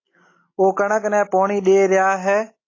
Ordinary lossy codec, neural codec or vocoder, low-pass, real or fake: AAC, 32 kbps; none; 7.2 kHz; real